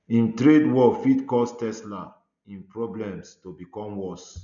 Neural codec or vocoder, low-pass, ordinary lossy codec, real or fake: none; 7.2 kHz; none; real